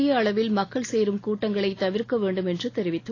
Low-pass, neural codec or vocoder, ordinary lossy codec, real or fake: 7.2 kHz; none; AAC, 32 kbps; real